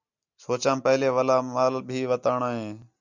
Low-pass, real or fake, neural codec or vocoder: 7.2 kHz; real; none